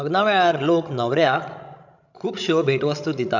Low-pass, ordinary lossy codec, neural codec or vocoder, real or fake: 7.2 kHz; none; codec, 16 kHz, 16 kbps, FreqCodec, larger model; fake